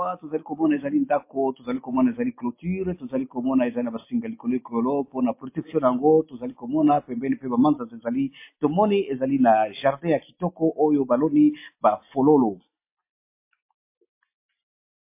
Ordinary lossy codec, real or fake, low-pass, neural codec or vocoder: MP3, 24 kbps; real; 3.6 kHz; none